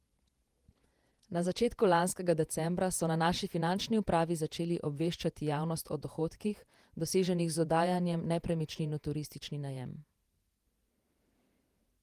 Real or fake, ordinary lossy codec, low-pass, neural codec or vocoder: fake; Opus, 24 kbps; 14.4 kHz; vocoder, 48 kHz, 128 mel bands, Vocos